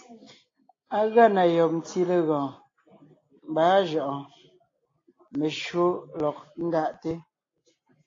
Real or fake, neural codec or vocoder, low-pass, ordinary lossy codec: real; none; 7.2 kHz; AAC, 32 kbps